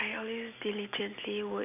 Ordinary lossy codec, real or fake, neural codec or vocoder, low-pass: none; real; none; 3.6 kHz